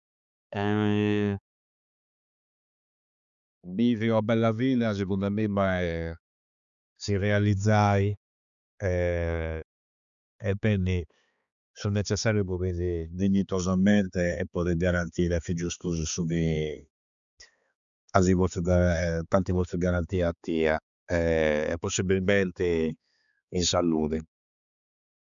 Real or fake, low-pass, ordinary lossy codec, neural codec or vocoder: fake; 7.2 kHz; none; codec, 16 kHz, 4 kbps, X-Codec, HuBERT features, trained on balanced general audio